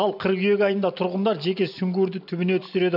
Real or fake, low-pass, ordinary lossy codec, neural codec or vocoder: real; 5.4 kHz; none; none